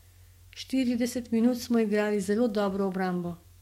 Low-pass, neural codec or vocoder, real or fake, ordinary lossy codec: 19.8 kHz; codec, 44.1 kHz, 7.8 kbps, DAC; fake; MP3, 64 kbps